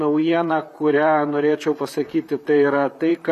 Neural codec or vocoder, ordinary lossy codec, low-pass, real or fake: vocoder, 44.1 kHz, 128 mel bands, Pupu-Vocoder; AAC, 96 kbps; 14.4 kHz; fake